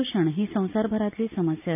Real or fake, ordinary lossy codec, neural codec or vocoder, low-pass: real; none; none; 3.6 kHz